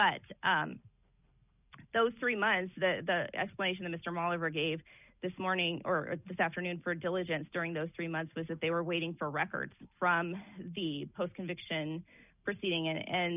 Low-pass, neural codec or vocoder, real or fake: 3.6 kHz; none; real